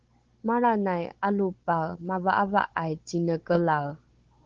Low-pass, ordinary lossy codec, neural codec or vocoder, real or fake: 7.2 kHz; Opus, 16 kbps; codec, 16 kHz, 16 kbps, FunCodec, trained on Chinese and English, 50 frames a second; fake